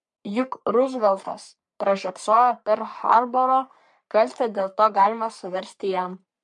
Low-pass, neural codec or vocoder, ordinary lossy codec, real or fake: 10.8 kHz; codec, 44.1 kHz, 3.4 kbps, Pupu-Codec; MP3, 64 kbps; fake